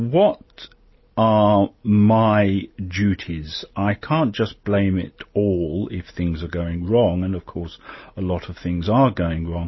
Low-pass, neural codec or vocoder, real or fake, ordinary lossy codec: 7.2 kHz; none; real; MP3, 24 kbps